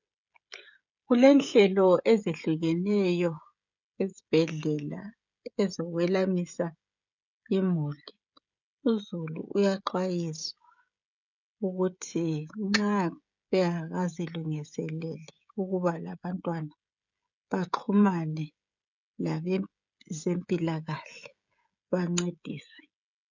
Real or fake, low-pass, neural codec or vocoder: fake; 7.2 kHz; codec, 16 kHz, 16 kbps, FreqCodec, smaller model